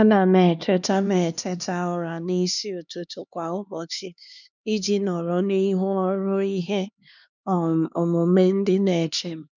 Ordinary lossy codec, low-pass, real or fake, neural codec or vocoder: none; 7.2 kHz; fake; codec, 16 kHz, 2 kbps, X-Codec, HuBERT features, trained on LibriSpeech